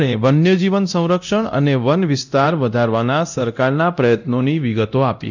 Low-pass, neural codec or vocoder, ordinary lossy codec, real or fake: 7.2 kHz; codec, 24 kHz, 0.9 kbps, DualCodec; none; fake